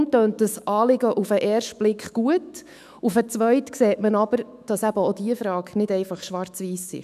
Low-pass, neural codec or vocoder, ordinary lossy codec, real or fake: 14.4 kHz; autoencoder, 48 kHz, 128 numbers a frame, DAC-VAE, trained on Japanese speech; none; fake